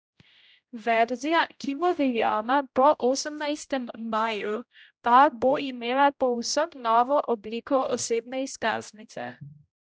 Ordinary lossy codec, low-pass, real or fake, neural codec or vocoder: none; none; fake; codec, 16 kHz, 0.5 kbps, X-Codec, HuBERT features, trained on general audio